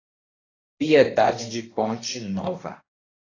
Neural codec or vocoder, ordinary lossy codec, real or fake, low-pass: codec, 16 kHz, 1 kbps, X-Codec, HuBERT features, trained on balanced general audio; AAC, 32 kbps; fake; 7.2 kHz